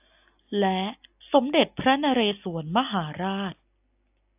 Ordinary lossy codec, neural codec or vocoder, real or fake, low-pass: AAC, 32 kbps; none; real; 3.6 kHz